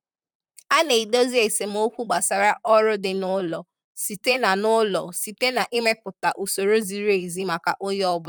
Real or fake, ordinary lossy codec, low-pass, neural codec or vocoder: fake; none; none; vocoder, 44.1 kHz, 128 mel bands, Pupu-Vocoder